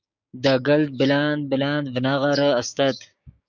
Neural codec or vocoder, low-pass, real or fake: codec, 16 kHz, 6 kbps, DAC; 7.2 kHz; fake